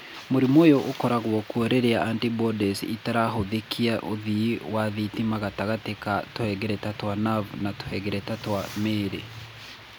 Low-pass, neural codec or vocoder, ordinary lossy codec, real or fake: none; none; none; real